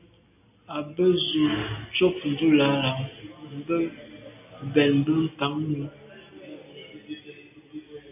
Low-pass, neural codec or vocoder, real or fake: 3.6 kHz; none; real